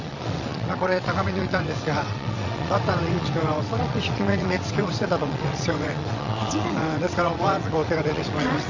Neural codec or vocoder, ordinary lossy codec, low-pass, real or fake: vocoder, 22.05 kHz, 80 mel bands, WaveNeXt; none; 7.2 kHz; fake